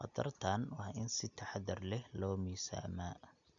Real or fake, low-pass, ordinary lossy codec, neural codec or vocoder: real; 7.2 kHz; none; none